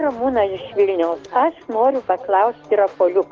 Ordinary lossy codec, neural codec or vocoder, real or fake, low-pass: Opus, 16 kbps; none; real; 7.2 kHz